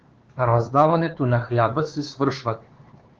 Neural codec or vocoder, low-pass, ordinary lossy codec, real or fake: codec, 16 kHz, 4 kbps, X-Codec, HuBERT features, trained on LibriSpeech; 7.2 kHz; Opus, 16 kbps; fake